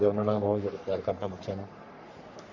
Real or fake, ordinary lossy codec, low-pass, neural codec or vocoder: fake; none; 7.2 kHz; codec, 44.1 kHz, 3.4 kbps, Pupu-Codec